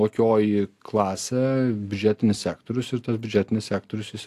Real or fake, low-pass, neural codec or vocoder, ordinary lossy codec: real; 14.4 kHz; none; AAC, 64 kbps